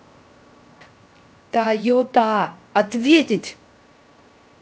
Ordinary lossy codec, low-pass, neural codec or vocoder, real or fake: none; none; codec, 16 kHz, 0.7 kbps, FocalCodec; fake